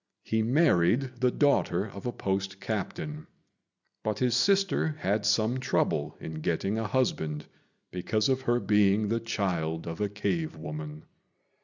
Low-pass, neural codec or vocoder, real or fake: 7.2 kHz; none; real